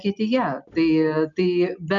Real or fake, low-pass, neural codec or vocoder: real; 7.2 kHz; none